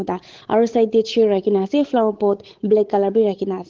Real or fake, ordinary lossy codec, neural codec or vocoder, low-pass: fake; Opus, 16 kbps; codec, 16 kHz, 8 kbps, FunCodec, trained on Chinese and English, 25 frames a second; 7.2 kHz